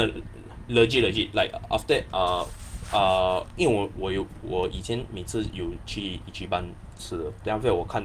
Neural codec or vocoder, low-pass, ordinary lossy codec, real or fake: none; 14.4 kHz; Opus, 24 kbps; real